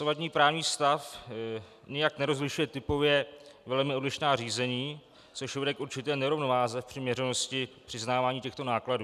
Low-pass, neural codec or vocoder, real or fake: 14.4 kHz; none; real